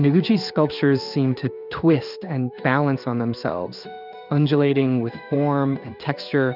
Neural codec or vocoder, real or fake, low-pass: codec, 16 kHz in and 24 kHz out, 1 kbps, XY-Tokenizer; fake; 5.4 kHz